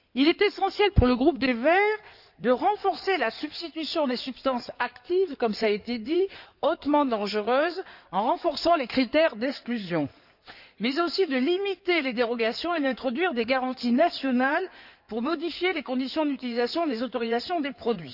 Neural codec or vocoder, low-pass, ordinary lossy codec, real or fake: codec, 16 kHz in and 24 kHz out, 2.2 kbps, FireRedTTS-2 codec; 5.4 kHz; none; fake